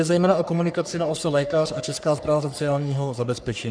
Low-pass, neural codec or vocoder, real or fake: 9.9 kHz; codec, 44.1 kHz, 3.4 kbps, Pupu-Codec; fake